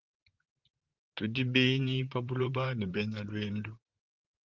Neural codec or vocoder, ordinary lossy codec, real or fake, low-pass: vocoder, 44.1 kHz, 128 mel bands, Pupu-Vocoder; Opus, 16 kbps; fake; 7.2 kHz